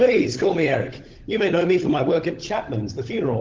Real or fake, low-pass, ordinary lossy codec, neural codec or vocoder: fake; 7.2 kHz; Opus, 16 kbps; codec, 16 kHz, 16 kbps, FunCodec, trained on LibriTTS, 50 frames a second